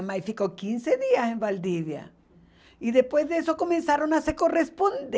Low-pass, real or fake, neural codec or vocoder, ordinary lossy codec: none; real; none; none